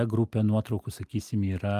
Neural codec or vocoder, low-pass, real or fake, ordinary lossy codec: none; 14.4 kHz; real; Opus, 32 kbps